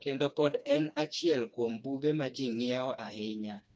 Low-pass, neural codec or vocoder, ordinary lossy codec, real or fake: none; codec, 16 kHz, 2 kbps, FreqCodec, smaller model; none; fake